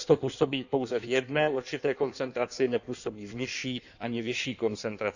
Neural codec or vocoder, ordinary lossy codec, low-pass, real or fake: codec, 16 kHz in and 24 kHz out, 1.1 kbps, FireRedTTS-2 codec; none; 7.2 kHz; fake